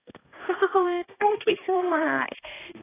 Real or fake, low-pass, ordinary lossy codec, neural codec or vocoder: fake; 3.6 kHz; AAC, 16 kbps; codec, 16 kHz, 1 kbps, X-Codec, HuBERT features, trained on balanced general audio